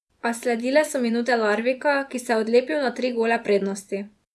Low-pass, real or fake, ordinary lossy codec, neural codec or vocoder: none; fake; none; vocoder, 24 kHz, 100 mel bands, Vocos